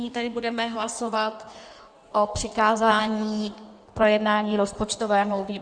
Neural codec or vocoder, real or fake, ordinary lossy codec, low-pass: codec, 16 kHz in and 24 kHz out, 1.1 kbps, FireRedTTS-2 codec; fake; Opus, 64 kbps; 9.9 kHz